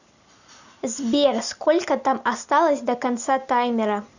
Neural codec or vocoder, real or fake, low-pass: none; real; 7.2 kHz